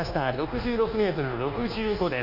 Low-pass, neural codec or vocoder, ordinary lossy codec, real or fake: 5.4 kHz; codec, 24 kHz, 1.2 kbps, DualCodec; none; fake